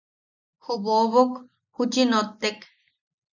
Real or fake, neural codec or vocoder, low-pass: real; none; 7.2 kHz